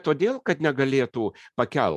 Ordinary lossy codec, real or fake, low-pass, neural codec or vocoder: MP3, 96 kbps; real; 14.4 kHz; none